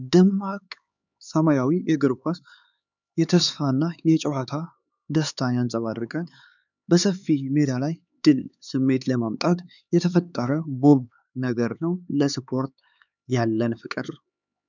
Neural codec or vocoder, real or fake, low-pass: codec, 16 kHz, 4 kbps, X-Codec, HuBERT features, trained on LibriSpeech; fake; 7.2 kHz